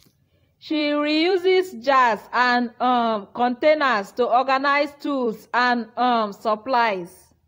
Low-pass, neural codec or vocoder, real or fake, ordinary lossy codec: 19.8 kHz; vocoder, 44.1 kHz, 128 mel bands every 256 samples, BigVGAN v2; fake; AAC, 48 kbps